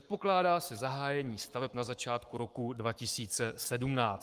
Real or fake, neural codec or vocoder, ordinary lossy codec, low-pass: fake; codec, 44.1 kHz, 7.8 kbps, Pupu-Codec; Opus, 32 kbps; 14.4 kHz